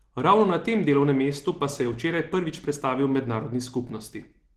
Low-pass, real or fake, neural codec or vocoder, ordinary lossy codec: 14.4 kHz; real; none; Opus, 16 kbps